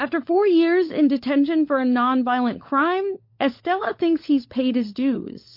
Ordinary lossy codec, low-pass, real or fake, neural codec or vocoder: MP3, 32 kbps; 5.4 kHz; fake; codec, 16 kHz, 16 kbps, FunCodec, trained on LibriTTS, 50 frames a second